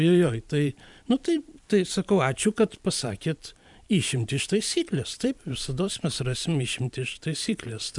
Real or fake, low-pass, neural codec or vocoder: fake; 10.8 kHz; vocoder, 44.1 kHz, 128 mel bands every 512 samples, BigVGAN v2